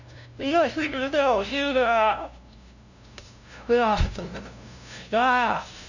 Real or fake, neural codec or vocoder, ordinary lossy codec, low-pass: fake; codec, 16 kHz, 0.5 kbps, FunCodec, trained on LibriTTS, 25 frames a second; none; 7.2 kHz